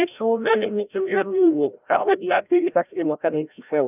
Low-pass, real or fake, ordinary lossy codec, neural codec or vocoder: 3.6 kHz; fake; none; codec, 16 kHz, 0.5 kbps, FreqCodec, larger model